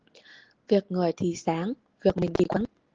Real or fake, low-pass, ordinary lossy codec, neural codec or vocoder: real; 7.2 kHz; Opus, 16 kbps; none